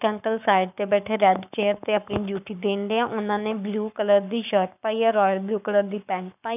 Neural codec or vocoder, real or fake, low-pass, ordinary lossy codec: codec, 44.1 kHz, 7.8 kbps, Pupu-Codec; fake; 3.6 kHz; none